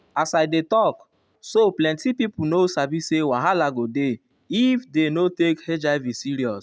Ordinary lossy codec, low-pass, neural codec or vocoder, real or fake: none; none; none; real